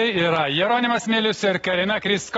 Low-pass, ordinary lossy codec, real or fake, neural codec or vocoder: 10.8 kHz; AAC, 24 kbps; real; none